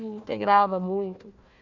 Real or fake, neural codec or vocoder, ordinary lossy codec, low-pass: fake; codec, 16 kHz, 1 kbps, FunCodec, trained on Chinese and English, 50 frames a second; none; 7.2 kHz